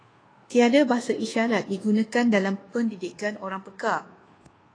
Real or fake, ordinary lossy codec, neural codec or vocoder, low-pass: fake; AAC, 32 kbps; codec, 24 kHz, 1.2 kbps, DualCodec; 9.9 kHz